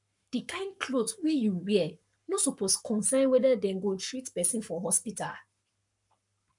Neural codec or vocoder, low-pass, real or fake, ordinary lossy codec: codec, 44.1 kHz, 7.8 kbps, Pupu-Codec; 10.8 kHz; fake; none